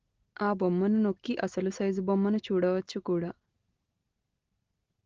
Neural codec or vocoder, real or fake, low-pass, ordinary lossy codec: none; real; 7.2 kHz; Opus, 16 kbps